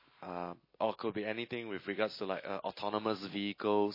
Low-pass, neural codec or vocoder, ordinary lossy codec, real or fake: 5.4 kHz; none; MP3, 24 kbps; real